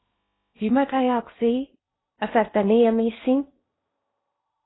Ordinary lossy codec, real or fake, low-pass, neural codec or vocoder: AAC, 16 kbps; fake; 7.2 kHz; codec, 16 kHz in and 24 kHz out, 0.6 kbps, FocalCodec, streaming, 2048 codes